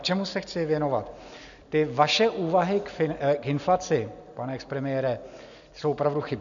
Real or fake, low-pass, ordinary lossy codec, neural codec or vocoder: real; 7.2 kHz; MP3, 96 kbps; none